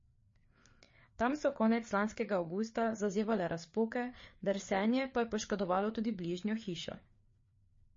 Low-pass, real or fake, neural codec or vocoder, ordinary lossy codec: 7.2 kHz; fake; codec, 16 kHz, 4 kbps, FreqCodec, larger model; MP3, 32 kbps